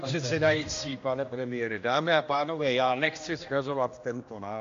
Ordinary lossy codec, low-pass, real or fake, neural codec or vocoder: AAC, 48 kbps; 7.2 kHz; fake; codec, 16 kHz, 1 kbps, X-Codec, HuBERT features, trained on general audio